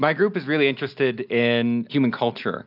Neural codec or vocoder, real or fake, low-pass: none; real; 5.4 kHz